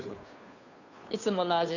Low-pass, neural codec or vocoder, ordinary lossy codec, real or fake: 7.2 kHz; codec, 16 kHz, 2 kbps, FunCodec, trained on Chinese and English, 25 frames a second; none; fake